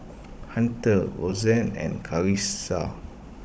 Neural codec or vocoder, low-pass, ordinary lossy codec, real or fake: codec, 16 kHz, 16 kbps, FunCodec, trained on Chinese and English, 50 frames a second; none; none; fake